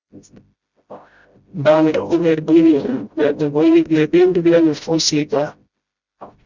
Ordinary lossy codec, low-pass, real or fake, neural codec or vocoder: Opus, 64 kbps; 7.2 kHz; fake; codec, 16 kHz, 0.5 kbps, FreqCodec, smaller model